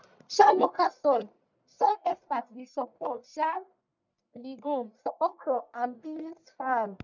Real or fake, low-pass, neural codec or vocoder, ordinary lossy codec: fake; 7.2 kHz; codec, 44.1 kHz, 1.7 kbps, Pupu-Codec; none